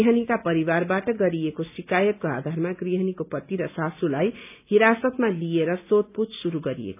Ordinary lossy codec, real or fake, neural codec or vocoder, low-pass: none; real; none; 3.6 kHz